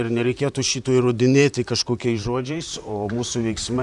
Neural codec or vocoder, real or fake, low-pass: vocoder, 44.1 kHz, 128 mel bands, Pupu-Vocoder; fake; 10.8 kHz